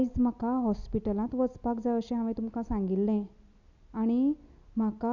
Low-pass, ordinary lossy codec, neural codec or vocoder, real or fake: 7.2 kHz; none; none; real